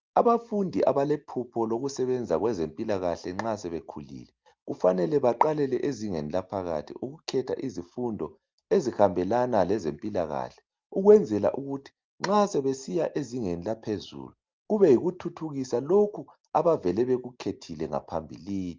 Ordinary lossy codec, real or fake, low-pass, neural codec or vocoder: Opus, 32 kbps; real; 7.2 kHz; none